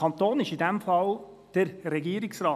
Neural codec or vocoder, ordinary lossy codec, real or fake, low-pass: none; none; real; 14.4 kHz